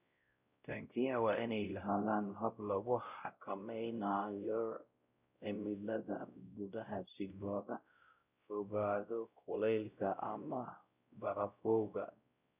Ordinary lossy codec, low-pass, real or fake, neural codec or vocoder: none; 3.6 kHz; fake; codec, 16 kHz, 0.5 kbps, X-Codec, WavLM features, trained on Multilingual LibriSpeech